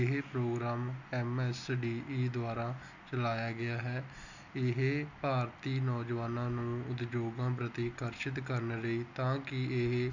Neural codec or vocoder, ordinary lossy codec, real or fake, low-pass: none; none; real; 7.2 kHz